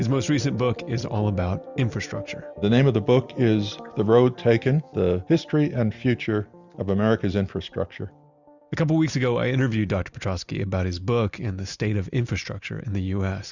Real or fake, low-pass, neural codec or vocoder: real; 7.2 kHz; none